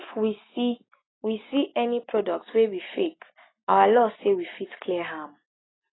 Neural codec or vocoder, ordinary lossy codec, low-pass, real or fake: none; AAC, 16 kbps; 7.2 kHz; real